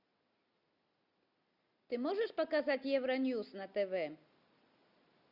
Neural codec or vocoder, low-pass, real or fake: none; 5.4 kHz; real